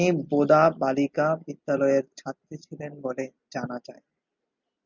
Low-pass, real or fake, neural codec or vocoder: 7.2 kHz; real; none